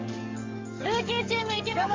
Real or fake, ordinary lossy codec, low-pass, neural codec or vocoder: fake; Opus, 32 kbps; 7.2 kHz; codec, 44.1 kHz, 7.8 kbps, DAC